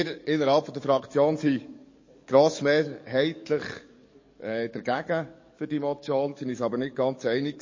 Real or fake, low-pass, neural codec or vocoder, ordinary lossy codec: fake; 7.2 kHz; codec, 44.1 kHz, 7.8 kbps, DAC; MP3, 32 kbps